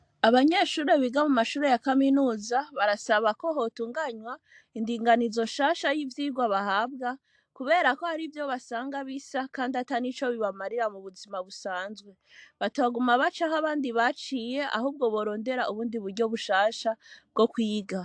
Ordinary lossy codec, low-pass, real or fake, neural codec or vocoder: AAC, 64 kbps; 9.9 kHz; real; none